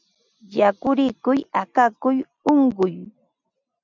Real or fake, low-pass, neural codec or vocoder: real; 7.2 kHz; none